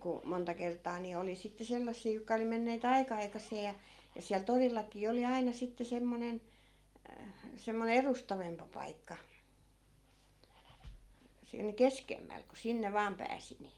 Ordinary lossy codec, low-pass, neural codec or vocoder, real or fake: Opus, 32 kbps; 19.8 kHz; none; real